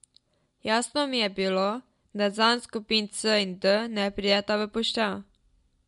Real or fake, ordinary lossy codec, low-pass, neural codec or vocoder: real; MP3, 64 kbps; 10.8 kHz; none